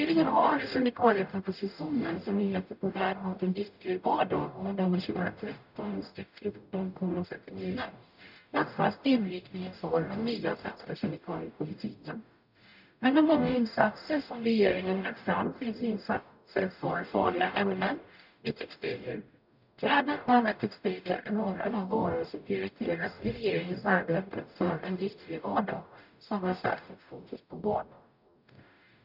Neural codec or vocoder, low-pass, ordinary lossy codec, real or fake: codec, 44.1 kHz, 0.9 kbps, DAC; 5.4 kHz; none; fake